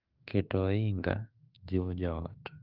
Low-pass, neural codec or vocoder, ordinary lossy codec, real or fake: 5.4 kHz; autoencoder, 48 kHz, 32 numbers a frame, DAC-VAE, trained on Japanese speech; Opus, 32 kbps; fake